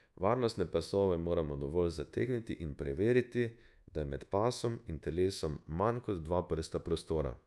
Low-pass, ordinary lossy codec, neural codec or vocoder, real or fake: none; none; codec, 24 kHz, 1.2 kbps, DualCodec; fake